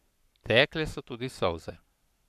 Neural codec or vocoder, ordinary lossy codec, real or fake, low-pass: codec, 44.1 kHz, 7.8 kbps, Pupu-Codec; none; fake; 14.4 kHz